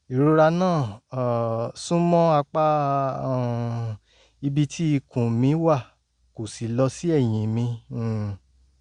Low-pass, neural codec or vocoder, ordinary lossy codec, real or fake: 9.9 kHz; none; Opus, 64 kbps; real